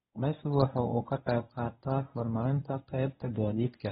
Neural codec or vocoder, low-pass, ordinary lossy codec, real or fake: codec, 24 kHz, 0.9 kbps, WavTokenizer, medium speech release version 1; 10.8 kHz; AAC, 16 kbps; fake